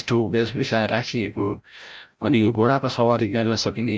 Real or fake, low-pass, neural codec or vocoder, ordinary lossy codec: fake; none; codec, 16 kHz, 0.5 kbps, FreqCodec, larger model; none